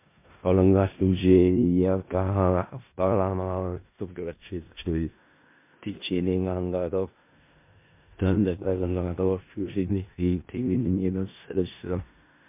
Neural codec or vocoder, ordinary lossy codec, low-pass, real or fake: codec, 16 kHz in and 24 kHz out, 0.4 kbps, LongCat-Audio-Codec, four codebook decoder; MP3, 32 kbps; 3.6 kHz; fake